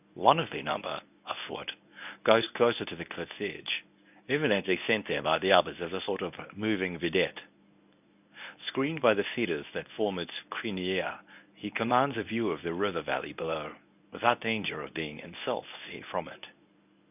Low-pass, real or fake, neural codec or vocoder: 3.6 kHz; fake; codec, 24 kHz, 0.9 kbps, WavTokenizer, medium speech release version 1